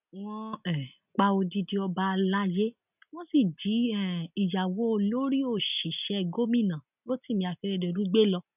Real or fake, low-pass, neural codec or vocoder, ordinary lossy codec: real; 3.6 kHz; none; none